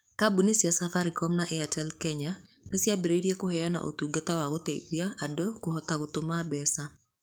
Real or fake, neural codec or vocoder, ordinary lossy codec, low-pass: fake; codec, 44.1 kHz, 7.8 kbps, DAC; none; none